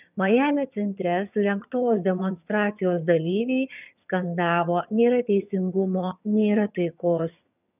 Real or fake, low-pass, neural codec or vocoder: fake; 3.6 kHz; vocoder, 22.05 kHz, 80 mel bands, HiFi-GAN